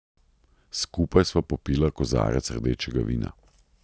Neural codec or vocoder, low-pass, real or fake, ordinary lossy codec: none; none; real; none